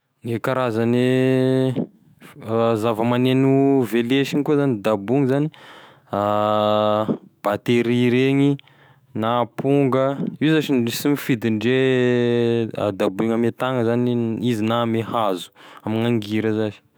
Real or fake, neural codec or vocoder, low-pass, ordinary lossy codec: fake; autoencoder, 48 kHz, 128 numbers a frame, DAC-VAE, trained on Japanese speech; none; none